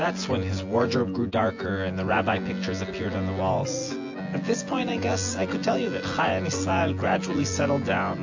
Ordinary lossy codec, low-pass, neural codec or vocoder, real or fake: AAC, 48 kbps; 7.2 kHz; vocoder, 24 kHz, 100 mel bands, Vocos; fake